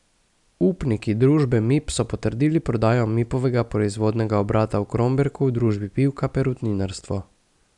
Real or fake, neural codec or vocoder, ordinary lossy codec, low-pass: real; none; none; 10.8 kHz